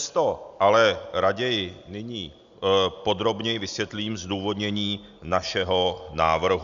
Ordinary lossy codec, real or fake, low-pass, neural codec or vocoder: Opus, 64 kbps; real; 7.2 kHz; none